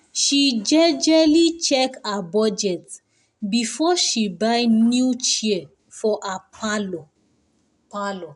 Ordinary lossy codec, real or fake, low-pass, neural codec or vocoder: none; real; 10.8 kHz; none